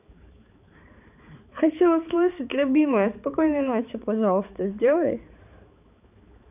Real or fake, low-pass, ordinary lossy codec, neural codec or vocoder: fake; 3.6 kHz; none; codec, 16 kHz, 4 kbps, X-Codec, HuBERT features, trained on balanced general audio